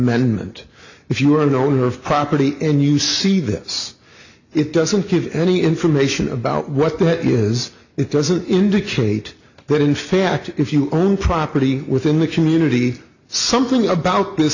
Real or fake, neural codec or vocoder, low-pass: real; none; 7.2 kHz